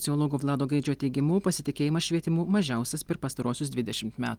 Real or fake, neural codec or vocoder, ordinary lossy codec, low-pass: real; none; Opus, 24 kbps; 19.8 kHz